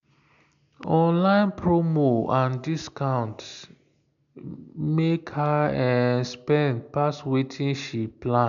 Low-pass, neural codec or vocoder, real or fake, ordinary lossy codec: 7.2 kHz; none; real; none